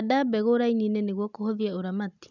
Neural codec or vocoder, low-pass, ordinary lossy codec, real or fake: none; 7.2 kHz; none; real